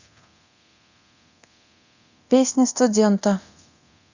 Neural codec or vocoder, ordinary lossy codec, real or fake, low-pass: codec, 24 kHz, 0.9 kbps, DualCodec; Opus, 64 kbps; fake; 7.2 kHz